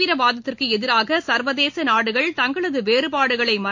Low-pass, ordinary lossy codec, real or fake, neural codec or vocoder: 7.2 kHz; MP3, 48 kbps; real; none